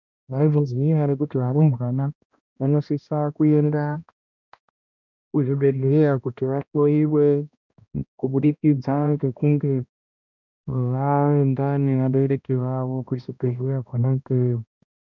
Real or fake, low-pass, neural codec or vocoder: fake; 7.2 kHz; codec, 16 kHz, 1 kbps, X-Codec, HuBERT features, trained on balanced general audio